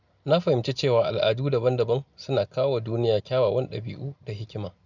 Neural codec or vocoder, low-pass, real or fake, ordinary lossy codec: none; 7.2 kHz; real; none